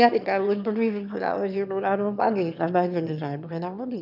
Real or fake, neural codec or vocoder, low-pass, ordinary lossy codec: fake; autoencoder, 22.05 kHz, a latent of 192 numbers a frame, VITS, trained on one speaker; 5.4 kHz; none